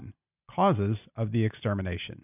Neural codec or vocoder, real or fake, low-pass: none; real; 3.6 kHz